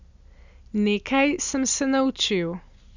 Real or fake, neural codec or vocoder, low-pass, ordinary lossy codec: real; none; 7.2 kHz; none